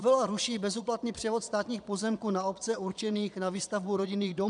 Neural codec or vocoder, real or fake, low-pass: vocoder, 22.05 kHz, 80 mel bands, Vocos; fake; 9.9 kHz